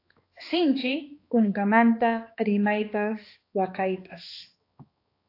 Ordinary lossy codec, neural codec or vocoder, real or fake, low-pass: AAC, 32 kbps; codec, 16 kHz, 2 kbps, X-Codec, HuBERT features, trained on balanced general audio; fake; 5.4 kHz